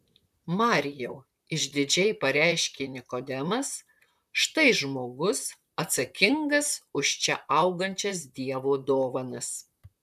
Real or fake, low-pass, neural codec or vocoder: fake; 14.4 kHz; vocoder, 44.1 kHz, 128 mel bands, Pupu-Vocoder